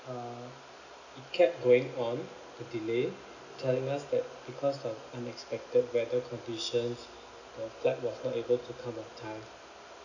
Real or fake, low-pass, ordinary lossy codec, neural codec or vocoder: real; 7.2 kHz; none; none